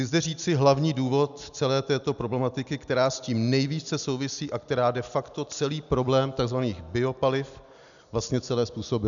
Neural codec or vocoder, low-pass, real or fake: none; 7.2 kHz; real